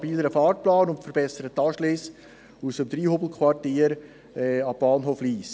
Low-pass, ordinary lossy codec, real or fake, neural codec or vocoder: none; none; real; none